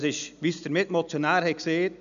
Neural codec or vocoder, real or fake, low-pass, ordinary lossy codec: none; real; 7.2 kHz; none